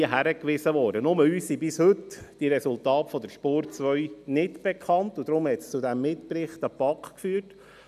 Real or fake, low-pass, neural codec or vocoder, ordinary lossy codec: real; 14.4 kHz; none; none